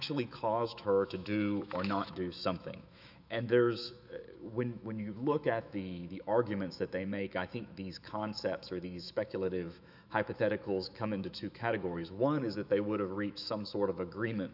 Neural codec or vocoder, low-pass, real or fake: autoencoder, 48 kHz, 128 numbers a frame, DAC-VAE, trained on Japanese speech; 5.4 kHz; fake